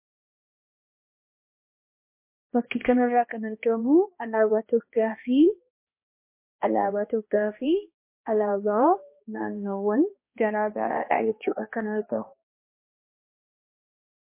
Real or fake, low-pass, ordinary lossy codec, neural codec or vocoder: fake; 3.6 kHz; MP3, 24 kbps; codec, 16 kHz, 1 kbps, X-Codec, HuBERT features, trained on balanced general audio